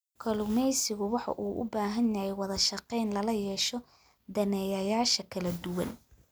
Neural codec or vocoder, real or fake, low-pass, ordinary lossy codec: none; real; none; none